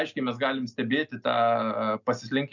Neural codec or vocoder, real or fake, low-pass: none; real; 7.2 kHz